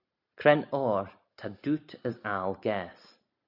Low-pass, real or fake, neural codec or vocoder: 5.4 kHz; real; none